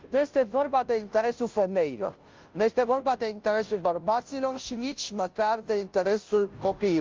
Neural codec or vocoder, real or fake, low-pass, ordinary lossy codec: codec, 16 kHz, 0.5 kbps, FunCodec, trained on Chinese and English, 25 frames a second; fake; 7.2 kHz; Opus, 24 kbps